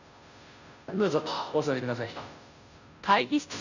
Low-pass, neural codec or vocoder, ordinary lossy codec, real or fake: 7.2 kHz; codec, 16 kHz, 0.5 kbps, FunCodec, trained on Chinese and English, 25 frames a second; none; fake